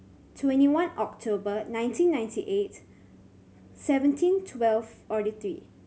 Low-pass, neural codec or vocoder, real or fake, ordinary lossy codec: none; none; real; none